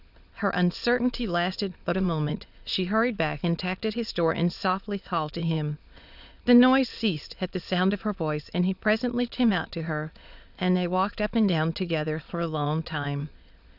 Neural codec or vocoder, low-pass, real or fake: autoencoder, 22.05 kHz, a latent of 192 numbers a frame, VITS, trained on many speakers; 5.4 kHz; fake